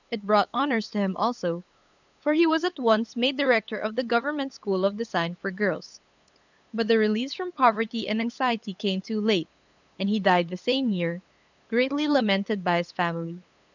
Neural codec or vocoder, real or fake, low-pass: codec, 16 kHz, 8 kbps, FunCodec, trained on LibriTTS, 25 frames a second; fake; 7.2 kHz